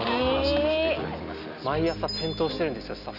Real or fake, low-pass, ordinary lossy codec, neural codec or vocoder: real; 5.4 kHz; none; none